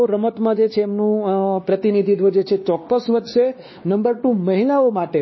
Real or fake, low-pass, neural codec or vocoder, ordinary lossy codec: fake; 7.2 kHz; codec, 16 kHz, 2 kbps, X-Codec, WavLM features, trained on Multilingual LibriSpeech; MP3, 24 kbps